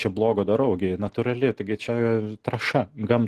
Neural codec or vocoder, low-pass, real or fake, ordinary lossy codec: none; 14.4 kHz; real; Opus, 16 kbps